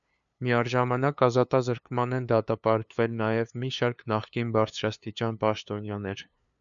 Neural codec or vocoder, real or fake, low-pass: codec, 16 kHz, 2 kbps, FunCodec, trained on LibriTTS, 25 frames a second; fake; 7.2 kHz